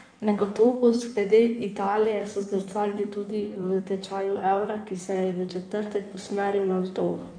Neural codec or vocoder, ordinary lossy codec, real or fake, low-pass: codec, 16 kHz in and 24 kHz out, 1.1 kbps, FireRedTTS-2 codec; none; fake; 9.9 kHz